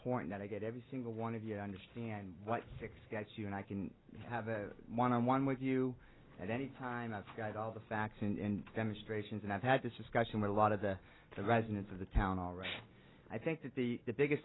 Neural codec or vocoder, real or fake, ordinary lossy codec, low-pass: none; real; AAC, 16 kbps; 7.2 kHz